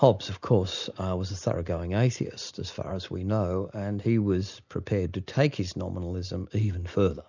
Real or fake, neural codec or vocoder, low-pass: real; none; 7.2 kHz